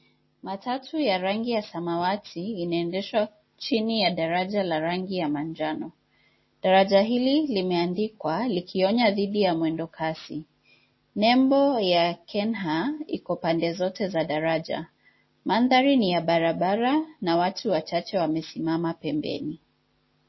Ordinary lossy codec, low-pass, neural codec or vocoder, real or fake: MP3, 24 kbps; 7.2 kHz; none; real